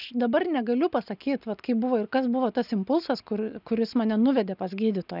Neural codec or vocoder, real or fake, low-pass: none; real; 5.4 kHz